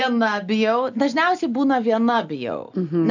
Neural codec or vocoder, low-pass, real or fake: none; 7.2 kHz; real